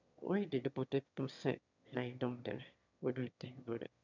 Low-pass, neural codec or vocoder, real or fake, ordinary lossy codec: 7.2 kHz; autoencoder, 22.05 kHz, a latent of 192 numbers a frame, VITS, trained on one speaker; fake; none